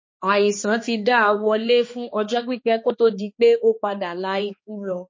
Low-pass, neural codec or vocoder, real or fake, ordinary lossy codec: 7.2 kHz; codec, 16 kHz, 2 kbps, X-Codec, HuBERT features, trained on balanced general audio; fake; MP3, 32 kbps